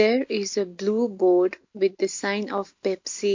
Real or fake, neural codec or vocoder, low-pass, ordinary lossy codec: real; none; 7.2 kHz; MP3, 48 kbps